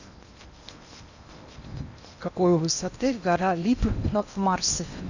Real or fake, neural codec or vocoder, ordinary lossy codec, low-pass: fake; codec, 16 kHz in and 24 kHz out, 0.8 kbps, FocalCodec, streaming, 65536 codes; none; 7.2 kHz